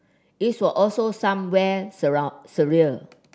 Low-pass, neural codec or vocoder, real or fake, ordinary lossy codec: none; none; real; none